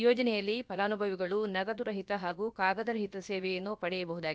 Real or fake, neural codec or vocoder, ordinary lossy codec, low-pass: fake; codec, 16 kHz, about 1 kbps, DyCAST, with the encoder's durations; none; none